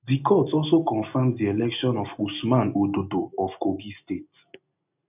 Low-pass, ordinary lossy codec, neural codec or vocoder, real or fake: 3.6 kHz; none; none; real